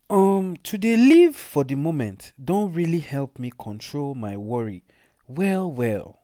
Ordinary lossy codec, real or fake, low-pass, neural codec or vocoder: none; real; 19.8 kHz; none